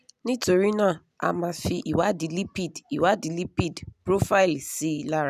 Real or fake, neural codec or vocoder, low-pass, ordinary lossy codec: real; none; 14.4 kHz; none